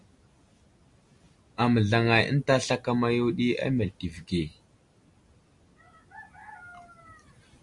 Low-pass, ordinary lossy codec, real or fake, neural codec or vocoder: 10.8 kHz; MP3, 96 kbps; real; none